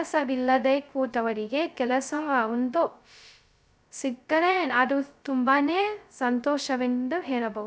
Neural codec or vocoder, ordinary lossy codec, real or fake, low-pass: codec, 16 kHz, 0.2 kbps, FocalCodec; none; fake; none